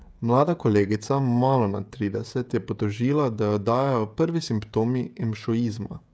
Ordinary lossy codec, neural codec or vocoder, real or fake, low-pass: none; codec, 16 kHz, 16 kbps, FreqCodec, smaller model; fake; none